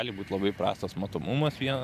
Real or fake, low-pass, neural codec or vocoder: fake; 14.4 kHz; vocoder, 44.1 kHz, 128 mel bands every 512 samples, BigVGAN v2